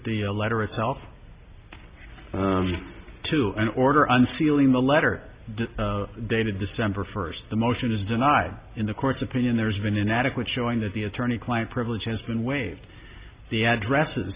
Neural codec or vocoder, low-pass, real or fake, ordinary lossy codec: none; 3.6 kHz; real; Opus, 64 kbps